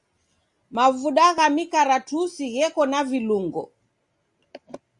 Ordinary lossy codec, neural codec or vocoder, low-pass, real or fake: Opus, 64 kbps; none; 10.8 kHz; real